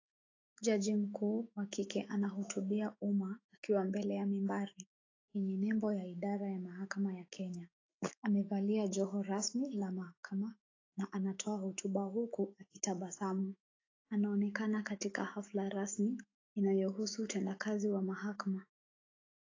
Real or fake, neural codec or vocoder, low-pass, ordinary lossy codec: fake; autoencoder, 48 kHz, 128 numbers a frame, DAC-VAE, trained on Japanese speech; 7.2 kHz; AAC, 32 kbps